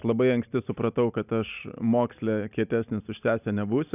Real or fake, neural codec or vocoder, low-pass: real; none; 3.6 kHz